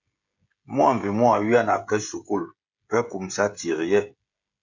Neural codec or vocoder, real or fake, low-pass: codec, 16 kHz, 16 kbps, FreqCodec, smaller model; fake; 7.2 kHz